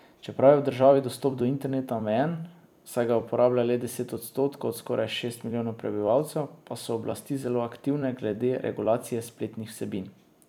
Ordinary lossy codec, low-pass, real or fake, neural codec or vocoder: none; 19.8 kHz; fake; vocoder, 44.1 kHz, 128 mel bands every 256 samples, BigVGAN v2